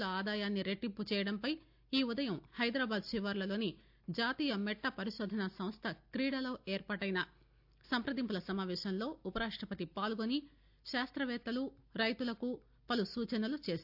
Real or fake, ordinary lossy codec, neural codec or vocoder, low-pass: real; AAC, 48 kbps; none; 5.4 kHz